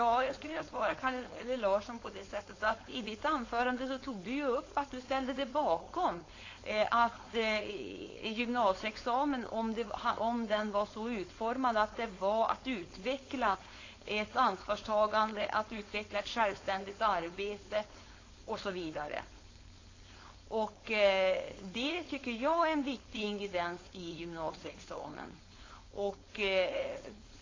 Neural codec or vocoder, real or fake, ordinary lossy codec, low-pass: codec, 16 kHz, 4.8 kbps, FACodec; fake; AAC, 32 kbps; 7.2 kHz